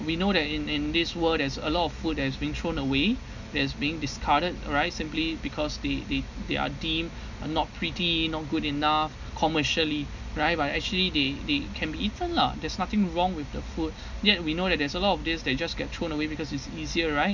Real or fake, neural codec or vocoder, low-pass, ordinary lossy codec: real; none; 7.2 kHz; none